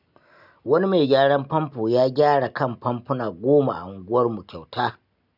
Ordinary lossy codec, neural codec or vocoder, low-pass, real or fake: none; none; 5.4 kHz; real